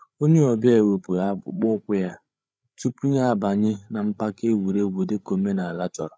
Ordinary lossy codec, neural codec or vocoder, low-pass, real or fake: none; codec, 16 kHz, 8 kbps, FreqCodec, larger model; none; fake